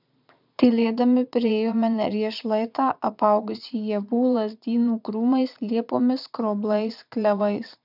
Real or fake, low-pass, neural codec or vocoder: fake; 5.4 kHz; vocoder, 22.05 kHz, 80 mel bands, Vocos